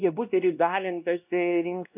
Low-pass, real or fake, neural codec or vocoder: 3.6 kHz; fake; codec, 16 kHz, 1 kbps, X-Codec, WavLM features, trained on Multilingual LibriSpeech